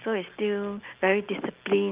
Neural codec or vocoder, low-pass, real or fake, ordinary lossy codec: none; 3.6 kHz; real; Opus, 32 kbps